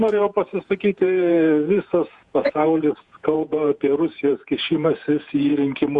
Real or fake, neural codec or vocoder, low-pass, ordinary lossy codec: fake; vocoder, 48 kHz, 128 mel bands, Vocos; 10.8 kHz; Opus, 64 kbps